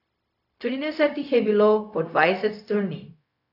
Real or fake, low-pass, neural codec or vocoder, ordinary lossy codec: fake; 5.4 kHz; codec, 16 kHz, 0.4 kbps, LongCat-Audio-Codec; none